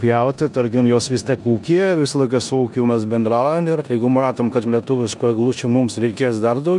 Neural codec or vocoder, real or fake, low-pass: codec, 16 kHz in and 24 kHz out, 0.9 kbps, LongCat-Audio-Codec, four codebook decoder; fake; 10.8 kHz